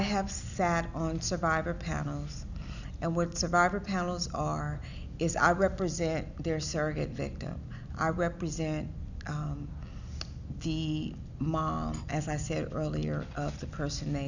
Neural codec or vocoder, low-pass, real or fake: none; 7.2 kHz; real